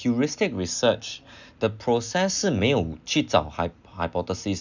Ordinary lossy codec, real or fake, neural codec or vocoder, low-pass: none; real; none; 7.2 kHz